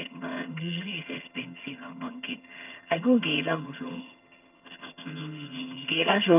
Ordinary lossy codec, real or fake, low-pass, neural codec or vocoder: none; fake; 3.6 kHz; vocoder, 22.05 kHz, 80 mel bands, HiFi-GAN